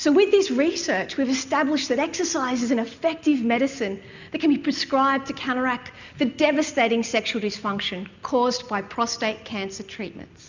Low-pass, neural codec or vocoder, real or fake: 7.2 kHz; none; real